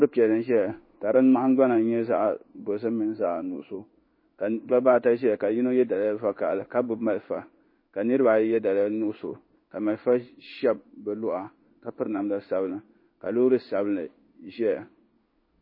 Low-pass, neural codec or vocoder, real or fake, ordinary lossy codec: 5.4 kHz; codec, 16 kHz in and 24 kHz out, 1 kbps, XY-Tokenizer; fake; MP3, 24 kbps